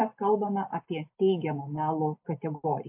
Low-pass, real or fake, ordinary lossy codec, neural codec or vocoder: 3.6 kHz; real; MP3, 32 kbps; none